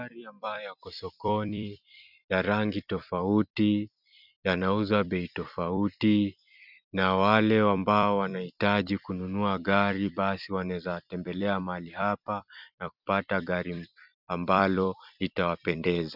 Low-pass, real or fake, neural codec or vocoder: 5.4 kHz; fake; vocoder, 44.1 kHz, 128 mel bands every 256 samples, BigVGAN v2